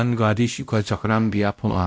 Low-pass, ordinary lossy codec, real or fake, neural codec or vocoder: none; none; fake; codec, 16 kHz, 0.5 kbps, X-Codec, WavLM features, trained on Multilingual LibriSpeech